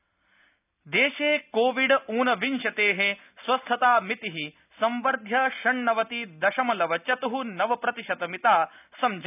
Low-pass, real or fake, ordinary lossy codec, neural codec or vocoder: 3.6 kHz; real; none; none